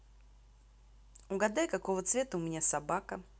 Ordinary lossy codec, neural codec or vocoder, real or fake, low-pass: none; none; real; none